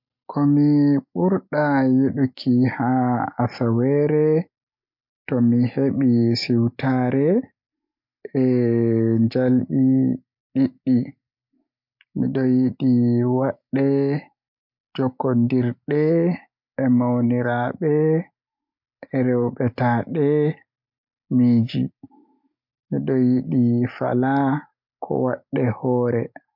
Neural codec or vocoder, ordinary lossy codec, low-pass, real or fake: none; MP3, 48 kbps; 5.4 kHz; real